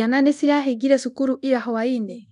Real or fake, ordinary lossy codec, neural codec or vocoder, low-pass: fake; none; codec, 24 kHz, 0.9 kbps, DualCodec; 10.8 kHz